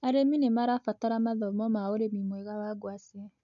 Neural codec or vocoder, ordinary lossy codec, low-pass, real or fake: none; none; 7.2 kHz; real